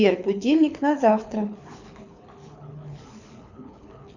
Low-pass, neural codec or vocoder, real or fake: 7.2 kHz; codec, 24 kHz, 6 kbps, HILCodec; fake